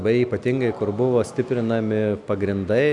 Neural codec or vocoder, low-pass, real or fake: none; 10.8 kHz; real